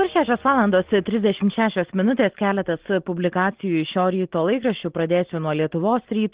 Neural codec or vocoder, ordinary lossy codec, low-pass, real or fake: none; Opus, 16 kbps; 3.6 kHz; real